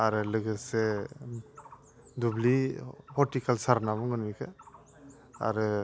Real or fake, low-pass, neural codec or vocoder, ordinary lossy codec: real; none; none; none